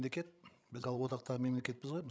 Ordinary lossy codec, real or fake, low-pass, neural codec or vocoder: none; fake; none; codec, 16 kHz, 16 kbps, FreqCodec, larger model